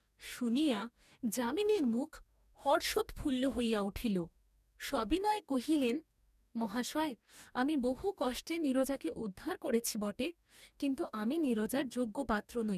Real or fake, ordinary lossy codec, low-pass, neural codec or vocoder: fake; none; 14.4 kHz; codec, 44.1 kHz, 2.6 kbps, DAC